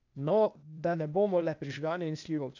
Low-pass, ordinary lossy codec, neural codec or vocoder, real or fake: 7.2 kHz; none; codec, 16 kHz, 0.8 kbps, ZipCodec; fake